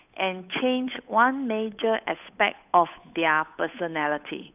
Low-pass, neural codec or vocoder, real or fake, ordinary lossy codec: 3.6 kHz; codec, 16 kHz, 8 kbps, FunCodec, trained on Chinese and English, 25 frames a second; fake; none